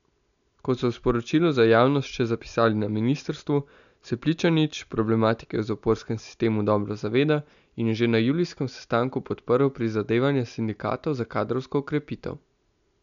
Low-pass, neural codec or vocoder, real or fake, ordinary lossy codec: 7.2 kHz; none; real; none